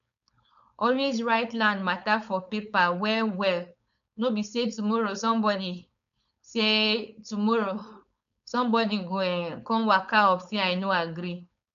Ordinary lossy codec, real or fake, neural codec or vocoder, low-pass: none; fake; codec, 16 kHz, 4.8 kbps, FACodec; 7.2 kHz